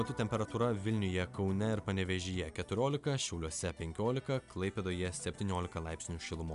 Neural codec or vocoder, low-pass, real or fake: none; 10.8 kHz; real